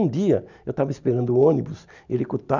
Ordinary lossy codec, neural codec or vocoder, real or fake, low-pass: none; none; real; 7.2 kHz